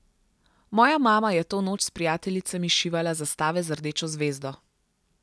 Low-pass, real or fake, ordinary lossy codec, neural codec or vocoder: none; real; none; none